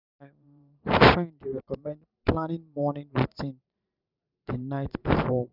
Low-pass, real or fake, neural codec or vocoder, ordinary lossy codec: 5.4 kHz; fake; vocoder, 44.1 kHz, 128 mel bands every 512 samples, BigVGAN v2; none